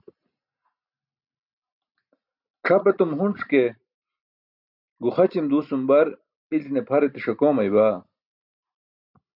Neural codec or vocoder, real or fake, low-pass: none; real; 5.4 kHz